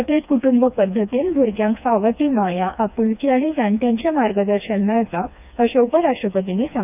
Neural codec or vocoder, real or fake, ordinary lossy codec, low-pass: codec, 16 kHz, 2 kbps, FreqCodec, smaller model; fake; none; 3.6 kHz